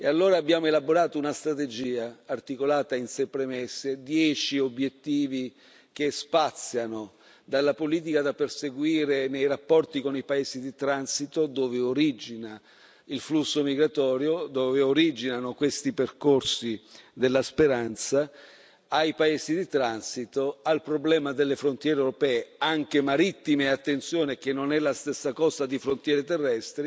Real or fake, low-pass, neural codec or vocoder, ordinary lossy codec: real; none; none; none